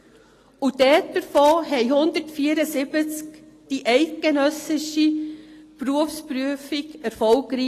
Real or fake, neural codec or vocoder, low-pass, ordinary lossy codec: real; none; 14.4 kHz; AAC, 48 kbps